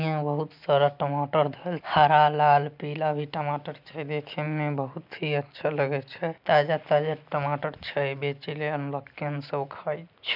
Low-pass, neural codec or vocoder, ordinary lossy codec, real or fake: 5.4 kHz; none; MP3, 48 kbps; real